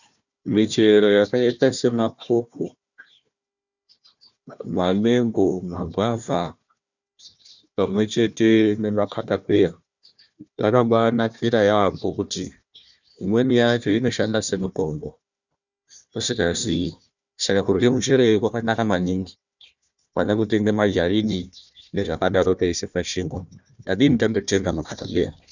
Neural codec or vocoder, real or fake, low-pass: codec, 16 kHz, 1 kbps, FunCodec, trained on Chinese and English, 50 frames a second; fake; 7.2 kHz